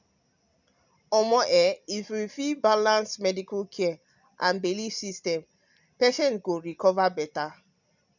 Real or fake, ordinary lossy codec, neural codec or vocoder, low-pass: real; none; none; 7.2 kHz